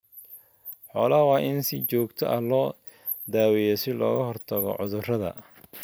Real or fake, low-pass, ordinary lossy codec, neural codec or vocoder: real; none; none; none